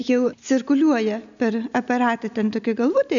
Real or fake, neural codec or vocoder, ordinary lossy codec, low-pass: real; none; MP3, 96 kbps; 7.2 kHz